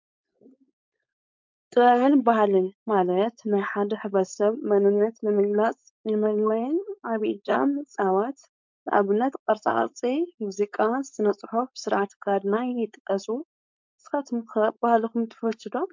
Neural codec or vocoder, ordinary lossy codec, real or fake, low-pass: codec, 16 kHz, 4.8 kbps, FACodec; MP3, 64 kbps; fake; 7.2 kHz